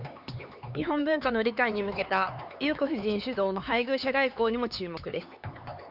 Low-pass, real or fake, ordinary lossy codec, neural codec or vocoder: 5.4 kHz; fake; Opus, 64 kbps; codec, 16 kHz, 4 kbps, X-Codec, HuBERT features, trained on LibriSpeech